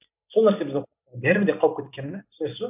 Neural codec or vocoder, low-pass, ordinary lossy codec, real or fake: none; 3.6 kHz; none; real